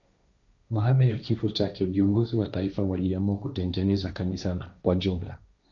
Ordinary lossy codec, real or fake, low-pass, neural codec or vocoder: MP3, 96 kbps; fake; 7.2 kHz; codec, 16 kHz, 1.1 kbps, Voila-Tokenizer